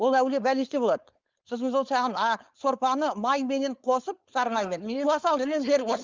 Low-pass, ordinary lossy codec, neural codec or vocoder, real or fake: 7.2 kHz; Opus, 32 kbps; codec, 16 kHz, 4.8 kbps, FACodec; fake